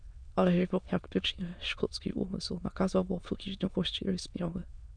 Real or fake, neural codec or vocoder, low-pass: fake; autoencoder, 22.05 kHz, a latent of 192 numbers a frame, VITS, trained on many speakers; 9.9 kHz